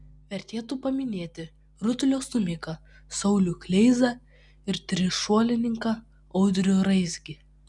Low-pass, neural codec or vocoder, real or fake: 10.8 kHz; none; real